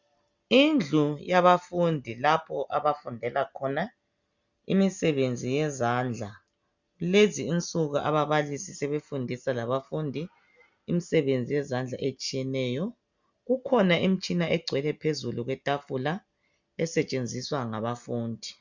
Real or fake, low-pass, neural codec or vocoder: real; 7.2 kHz; none